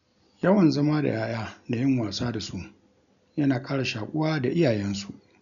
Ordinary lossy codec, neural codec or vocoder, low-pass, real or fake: none; none; 7.2 kHz; real